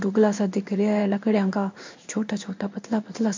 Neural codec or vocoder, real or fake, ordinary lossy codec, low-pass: codec, 16 kHz in and 24 kHz out, 1 kbps, XY-Tokenizer; fake; MP3, 64 kbps; 7.2 kHz